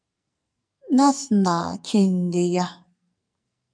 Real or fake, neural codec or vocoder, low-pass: fake; codec, 44.1 kHz, 2.6 kbps, SNAC; 9.9 kHz